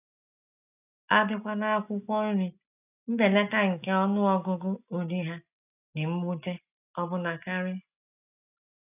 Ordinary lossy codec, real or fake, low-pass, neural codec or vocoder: none; real; 3.6 kHz; none